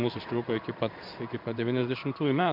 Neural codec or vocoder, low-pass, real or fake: codec, 16 kHz in and 24 kHz out, 1 kbps, XY-Tokenizer; 5.4 kHz; fake